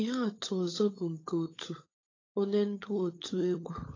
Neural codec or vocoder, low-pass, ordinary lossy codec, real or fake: codec, 16 kHz, 16 kbps, FunCodec, trained on LibriTTS, 50 frames a second; 7.2 kHz; AAC, 32 kbps; fake